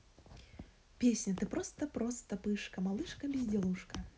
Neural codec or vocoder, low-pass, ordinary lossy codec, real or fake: none; none; none; real